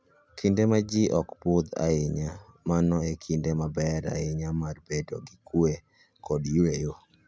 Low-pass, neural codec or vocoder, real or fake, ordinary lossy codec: none; none; real; none